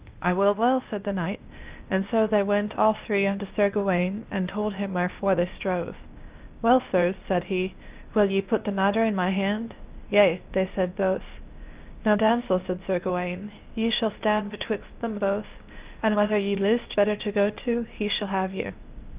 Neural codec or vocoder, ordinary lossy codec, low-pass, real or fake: codec, 16 kHz, 0.8 kbps, ZipCodec; Opus, 32 kbps; 3.6 kHz; fake